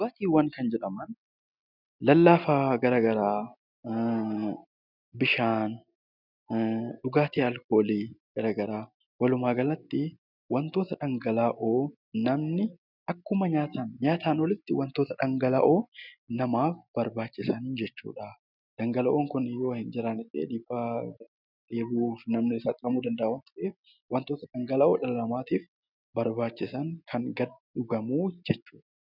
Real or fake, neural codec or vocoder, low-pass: real; none; 5.4 kHz